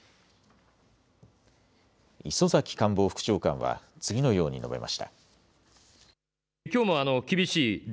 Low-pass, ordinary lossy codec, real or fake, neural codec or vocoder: none; none; real; none